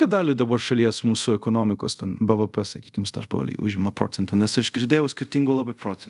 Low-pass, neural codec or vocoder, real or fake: 10.8 kHz; codec, 24 kHz, 0.5 kbps, DualCodec; fake